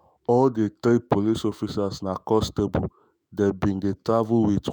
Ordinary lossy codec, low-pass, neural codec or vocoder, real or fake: none; none; autoencoder, 48 kHz, 128 numbers a frame, DAC-VAE, trained on Japanese speech; fake